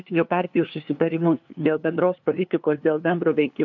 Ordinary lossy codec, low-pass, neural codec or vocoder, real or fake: MP3, 64 kbps; 7.2 kHz; codec, 16 kHz, 2 kbps, FunCodec, trained on LibriTTS, 25 frames a second; fake